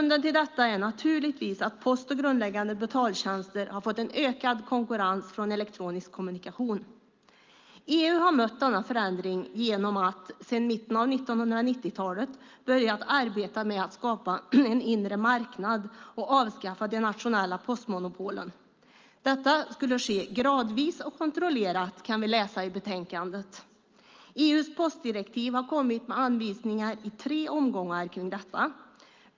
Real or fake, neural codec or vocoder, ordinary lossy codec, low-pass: real; none; Opus, 24 kbps; 7.2 kHz